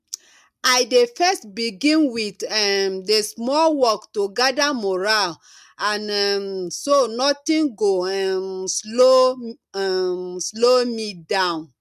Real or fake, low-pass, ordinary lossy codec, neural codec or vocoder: real; 14.4 kHz; MP3, 96 kbps; none